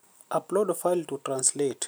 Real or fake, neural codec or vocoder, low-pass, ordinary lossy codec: fake; vocoder, 44.1 kHz, 128 mel bands every 256 samples, BigVGAN v2; none; none